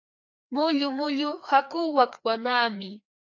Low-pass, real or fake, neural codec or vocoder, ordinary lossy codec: 7.2 kHz; fake; codec, 16 kHz, 2 kbps, FreqCodec, larger model; AAC, 48 kbps